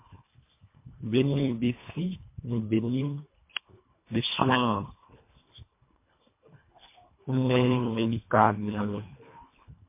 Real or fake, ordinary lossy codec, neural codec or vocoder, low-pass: fake; MP3, 24 kbps; codec, 24 kHz, 1.5 kbps, HILCodec; 3.6 kHz